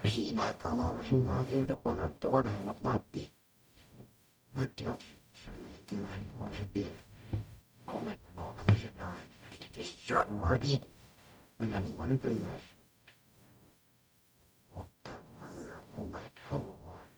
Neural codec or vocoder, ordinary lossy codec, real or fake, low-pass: codec, 44.1 kHz, 0.9 kbps, DAC; none; fake; none